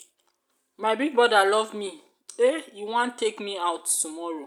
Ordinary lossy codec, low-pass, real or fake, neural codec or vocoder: none; 19.8 kHz; real; none